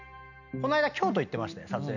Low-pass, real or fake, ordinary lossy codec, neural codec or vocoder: 7.2 kHz; real; none; none